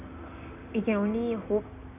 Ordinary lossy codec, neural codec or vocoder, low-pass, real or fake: none; none; 3.6 kHz; real